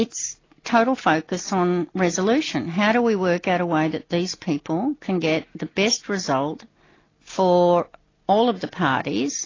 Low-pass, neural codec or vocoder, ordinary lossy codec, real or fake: 7.2 kHz; none; AAC, 32 kbps; real